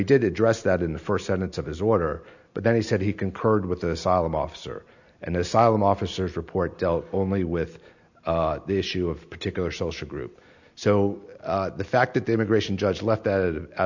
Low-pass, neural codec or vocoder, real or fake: 7.2 kHz; none; real